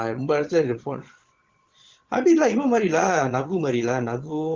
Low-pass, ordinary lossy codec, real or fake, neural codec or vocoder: 7.2 kHz; Opus, 16 kbps; fake; vocoder, 22.05 kHz, 80 mel bands, Vocos